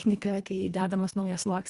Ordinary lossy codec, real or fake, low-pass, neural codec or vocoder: Opus, 64 kbps; fake; 10.8 kHz; codec, 24 kHz, 1.5 kbps, HILCodec